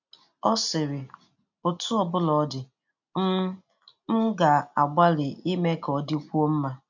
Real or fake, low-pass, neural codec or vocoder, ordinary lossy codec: real; 7.2 kHz; none; none